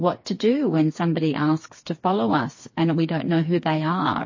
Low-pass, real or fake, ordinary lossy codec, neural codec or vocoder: 7.2 kHz; fake; MP3, 32 kbps; codec, 16 kHz, 4 kbps, FreqCodec, smaller model